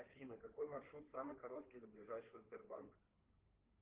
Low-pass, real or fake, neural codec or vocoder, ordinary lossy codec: 3.6 kHz; fake; codec, 16 kHz in and 24 kHz out, 2.2 kbps, FireRedTTS-2 codec; Opus, 16 kbps